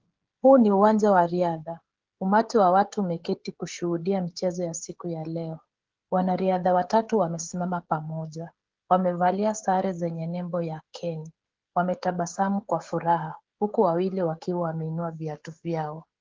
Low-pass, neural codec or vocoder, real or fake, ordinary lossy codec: 7.2 kHz; codec, 16 kHz, 16 kbps, FreqCodec, smaller model; fake; Opus, 16 kbps